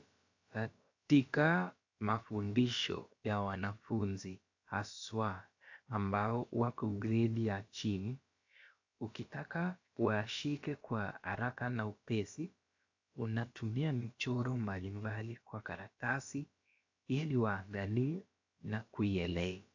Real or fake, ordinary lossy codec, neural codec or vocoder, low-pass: fake; AAC, 48 kbps; codec, 16 kHz, about 1 kbps, DyCAST, with the encoder's durations; 7.2 kHz